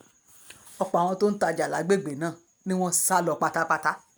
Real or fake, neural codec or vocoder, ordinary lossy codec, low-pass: real; none; none; none